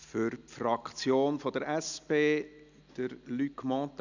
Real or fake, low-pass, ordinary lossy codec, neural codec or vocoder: real; 7.2 kHz; none; none